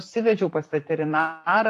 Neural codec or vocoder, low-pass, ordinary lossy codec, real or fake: vocoder, 44.1 kHz, 128 mel bands, Pupu-Vocoder; 14.4 kHz; AAC, 64 kbps; fake